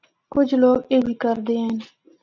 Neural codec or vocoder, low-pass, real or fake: none; 7.2 kHz; real